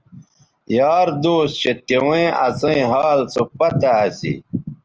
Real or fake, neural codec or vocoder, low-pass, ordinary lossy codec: real; none; 7.2 kHz; Opus, 24 kbps